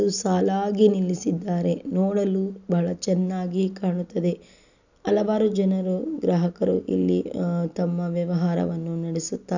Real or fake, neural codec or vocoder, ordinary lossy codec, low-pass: real; none; none; 7.2 kHz